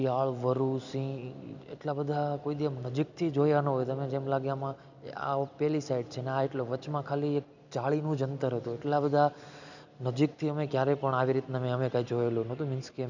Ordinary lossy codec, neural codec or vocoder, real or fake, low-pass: none; none; real; 7.2 kHz